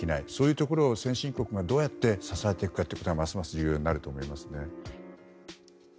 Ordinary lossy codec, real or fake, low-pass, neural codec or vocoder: none; real; none; none